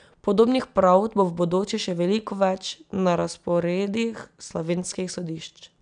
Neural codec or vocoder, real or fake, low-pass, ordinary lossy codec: none; real; 9.9 kHz; none